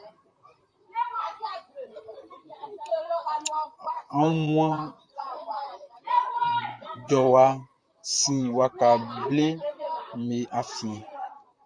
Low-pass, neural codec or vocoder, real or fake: 9.9 kHz; vocoder, 44.1 kHz, 128 mel bands, Pupu-Vocoder; fake